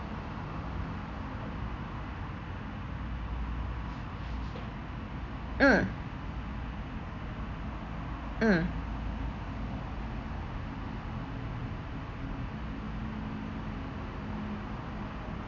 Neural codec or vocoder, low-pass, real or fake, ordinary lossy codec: none; 7.2 kHz; real; none